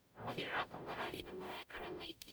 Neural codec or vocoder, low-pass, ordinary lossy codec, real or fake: codec, 44.1 kHz, 0.9 kbps, DAC; none; none; fake